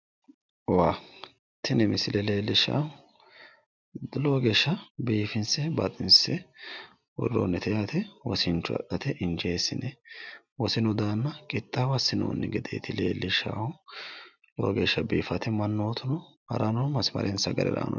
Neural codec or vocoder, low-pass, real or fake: none; 7.2 kHz; real